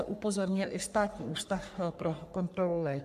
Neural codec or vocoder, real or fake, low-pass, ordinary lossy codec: codec, 44.1 kHz, 3.4 kbps, Pupu-Codec; fake; 14.4 kHz; AAC, 96 kbps